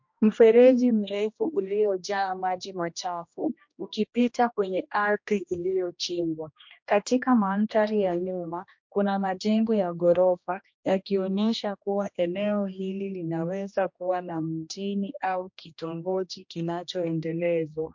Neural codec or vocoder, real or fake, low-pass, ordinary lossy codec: codec, 16 kHz, 1 kbps, X-Codec, HuBERT features, trained on general audio; fake; 7.2 kHz; MP3, 48 kbps